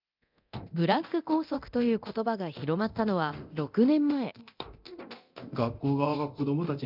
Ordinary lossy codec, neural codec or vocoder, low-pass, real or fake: none; codec, 24 kHz, 0.9 kbps, DualCodec; 5.4 kHz; fake